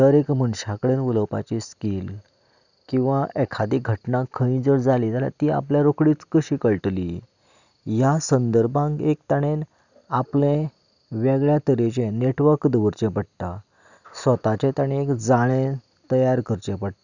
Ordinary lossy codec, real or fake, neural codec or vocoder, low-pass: none; real; none; 7.2 kHz